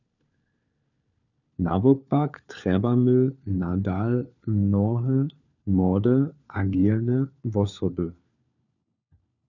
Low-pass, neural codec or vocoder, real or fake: 7.2 kHz; codec, 16 kHz, 4 kbps, FunCodec, trained on LibriTTS, 50 frames a second; fake